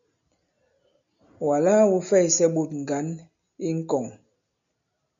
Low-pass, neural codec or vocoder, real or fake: 7.2 kHz; none; real